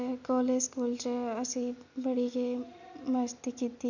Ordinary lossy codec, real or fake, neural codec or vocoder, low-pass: none; real; none; 7.2 kHz